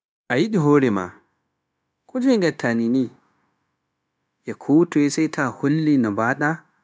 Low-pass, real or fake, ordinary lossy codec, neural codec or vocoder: none; fake; none; codec, 16 kHz, 0.9 kbps, LongCat-Audio-Codec